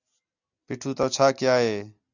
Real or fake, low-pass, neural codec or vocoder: real; 7.2 kHz; none